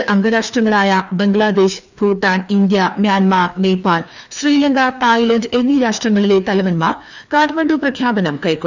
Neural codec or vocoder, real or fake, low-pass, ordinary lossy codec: codec, 16 kHz, 2 kbps, FreqCodec, larger model; fake; 7.2 kHz; none